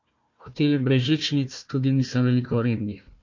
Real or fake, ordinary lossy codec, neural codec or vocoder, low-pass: fake; MP3, 48 kbps; codec, 16 kHz, 1 kbps, FunCodec, trained on Chinese and English, 50 frames a second; 7.2 kHz